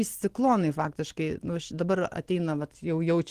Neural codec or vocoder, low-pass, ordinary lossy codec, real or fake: none; 14.4 kHz; Opus, 16 kbps; real